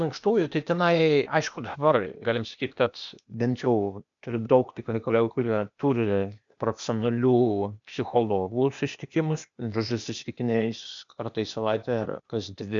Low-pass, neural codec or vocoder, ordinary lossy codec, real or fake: 7.2 kHz; codec, 16 kHz, 0.8 kbps, ZipCodec; MP3, 64 kbps; fake